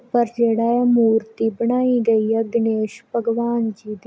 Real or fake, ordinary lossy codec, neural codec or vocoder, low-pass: real; none; none; none